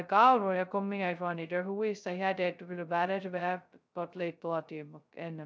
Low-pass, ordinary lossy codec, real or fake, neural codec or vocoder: none; none; fake; codec, 16 kHz, 0.2 kbps, FocalCodec